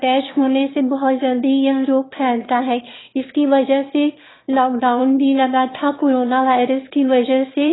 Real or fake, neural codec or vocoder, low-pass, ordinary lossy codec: fake; autoencoder, 22.05 kHz, a latent of 192 numbers a frame, VITS, trained on one speaker; 7.2 kHz; AAC, 16 kbps